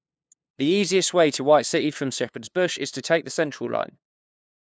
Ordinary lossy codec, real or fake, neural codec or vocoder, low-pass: none; fake; codec, 16 kHz, 2 kbps, FunCodec, trained on LibriTTS, 25 frames a second; none